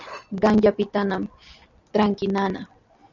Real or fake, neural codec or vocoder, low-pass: real; none; 7.2 kHz